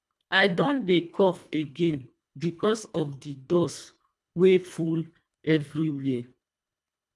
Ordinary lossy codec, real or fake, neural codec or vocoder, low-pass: none; fake; codec, 24 kHz, 1.5 kbps, HILCodec; none